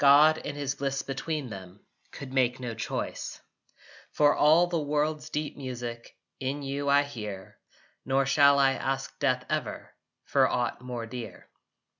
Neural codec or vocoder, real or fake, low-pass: none; real; 7.2 kHz